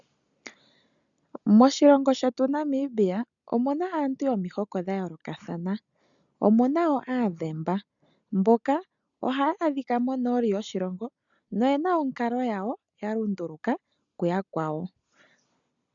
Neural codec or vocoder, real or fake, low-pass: none; real; 7.2 kHz